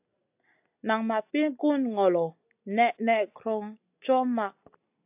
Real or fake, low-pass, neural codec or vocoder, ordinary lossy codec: real; 3.6 kHz; none; AAC, 32 kbps